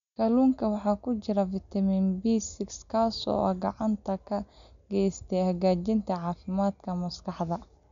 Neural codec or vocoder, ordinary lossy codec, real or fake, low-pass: none; none; real; 7.2 kHz